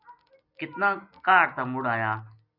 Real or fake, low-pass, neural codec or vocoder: real; 5.4 kHz; none